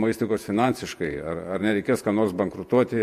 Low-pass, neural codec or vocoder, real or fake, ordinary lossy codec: 14.4 kHz; vocoder, 48 kHz, 128 mel bands, Vocos; fake; MP3, 64 kbps